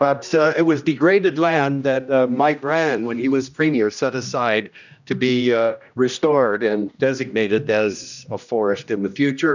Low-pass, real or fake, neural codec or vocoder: 7.2 kHz; fake; codec, 16 kHz, 1 kbps, X-Codec, HuBERT features, trained on general audio